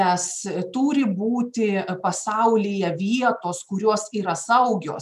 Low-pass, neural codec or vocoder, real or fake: 14.4 kHz; none; real